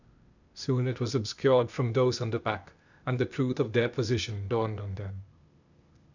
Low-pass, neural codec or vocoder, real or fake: 7.2 kHz; codec, 16 kHz, 0.8 kbps, ZipCodec; fake